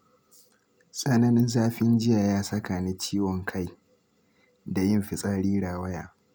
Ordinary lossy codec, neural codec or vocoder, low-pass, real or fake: none; none; none; real